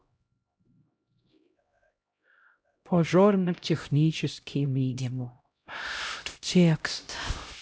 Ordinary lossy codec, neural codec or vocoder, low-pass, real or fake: none; codec, 16 kHz, 0.5 kbps, X-Codec, HuBERT features, trained on LibriSpeech; none; fake